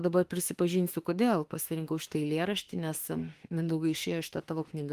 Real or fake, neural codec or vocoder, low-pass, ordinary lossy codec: fake; autoencoder, 48 kHz, 32 numbers a frame, DAC-VAE, trained on Japanese speech; 14.4 kHz; Opus, 24 kbps